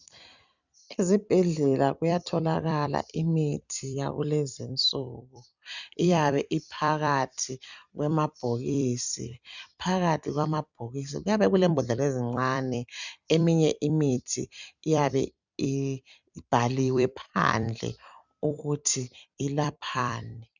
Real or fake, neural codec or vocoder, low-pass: fake; vocoder, 44.1 kHz, 128 mel bands every 256 samples, BigVGAN v2; 7.2 kHz